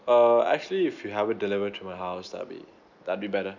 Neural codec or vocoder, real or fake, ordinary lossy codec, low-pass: none; real; none; 7.2 kHz